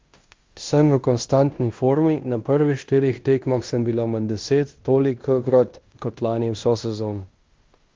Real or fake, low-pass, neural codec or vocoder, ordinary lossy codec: fake; 7.2 kHz; codec, 16 kHz in and 24 kHz out, 0.9 kbps, LongCat-Audio-Codec, fine tuned four codebook decoder; Opus, 32 kbps